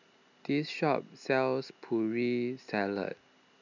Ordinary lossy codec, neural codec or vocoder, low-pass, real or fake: none; none; 7.2 kHz; real